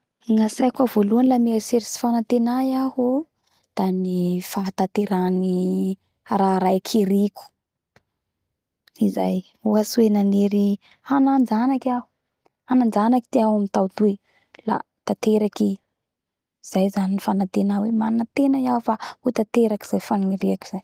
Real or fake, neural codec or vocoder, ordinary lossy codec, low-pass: real; none; Opus, 24 kbps; 14.4 kHz